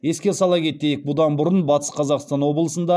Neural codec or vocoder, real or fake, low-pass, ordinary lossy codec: none; real; none; none